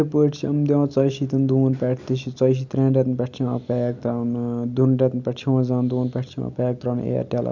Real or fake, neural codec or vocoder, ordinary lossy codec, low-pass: real; none; none; 7.2 kHz